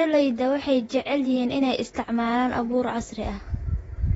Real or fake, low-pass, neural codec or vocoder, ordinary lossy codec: fake; 19.8 kHz; vocoder, 48 kHz, 128 mel bands, Vocos; AAC, 24 kbps